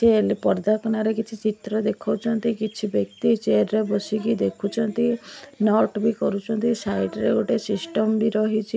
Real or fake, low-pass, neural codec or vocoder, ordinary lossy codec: real; none; none; none